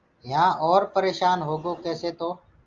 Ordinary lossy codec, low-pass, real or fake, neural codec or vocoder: Opus, 32 kbps; 7.2 kHz; real; none